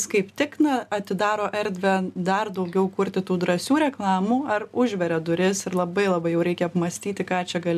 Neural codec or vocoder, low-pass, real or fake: none; 14.4 kHz; real